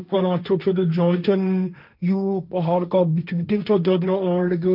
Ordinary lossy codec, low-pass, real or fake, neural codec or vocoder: none; 5.4 kHz; fake; codec, 16 kHz, 1.1 kbps, Voila-Tokenizer